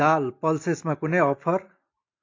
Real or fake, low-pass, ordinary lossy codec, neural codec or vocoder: real; 7.2 kHz; AAC, 48 kbps; none